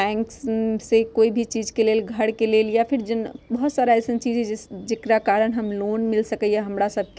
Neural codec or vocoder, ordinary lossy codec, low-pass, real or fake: none; none; none; real